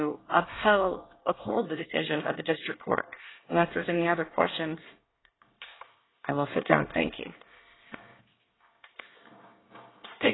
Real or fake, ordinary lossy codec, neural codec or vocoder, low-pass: fake; AAC, 16 kbps; codec, 24 kHz, 1 kbps, SNAC; 7.2 kHz